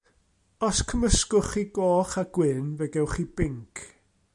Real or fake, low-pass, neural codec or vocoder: real; 10.8 kHz; none